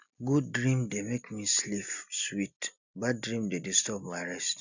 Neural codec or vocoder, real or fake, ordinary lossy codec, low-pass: none; real; none; 7.2 kHz